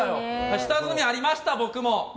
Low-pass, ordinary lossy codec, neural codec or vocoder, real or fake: none; none; none; real